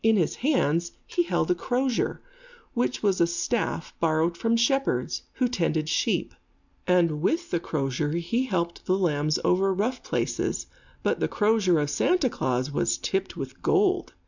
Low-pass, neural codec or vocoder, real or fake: 7.2 kHz; none; real